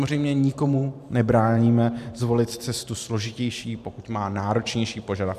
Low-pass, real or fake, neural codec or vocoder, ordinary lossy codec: 14.4 kHz; fake; vocoder, 44.1 kHz, 128 mel bands every 512 samples, BigVGAN v2; MP3, 96 kbps